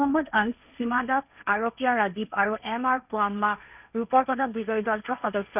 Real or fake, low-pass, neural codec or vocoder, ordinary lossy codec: fake; 3.6 kHz; codec, 16 kHz, 1.1 kbps, Voila-Tokenizer; none